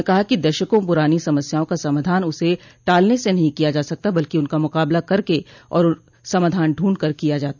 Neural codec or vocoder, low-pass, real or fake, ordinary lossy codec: none; 7.2 kHz; real; none